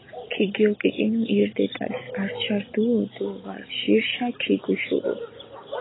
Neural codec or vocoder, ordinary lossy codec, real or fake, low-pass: none; AAC, 16 kbps; real; 7.2 kHz